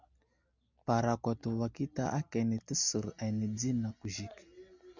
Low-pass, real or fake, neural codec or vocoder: 7.2 kHz; real; none